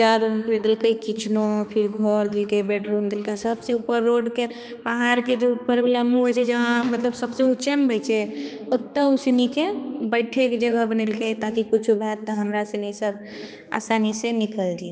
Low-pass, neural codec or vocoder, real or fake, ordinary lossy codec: none; codec, 16 kHz, 2 kbps, X-Codec, HuBERT features, trained on balanced general audio; fake; none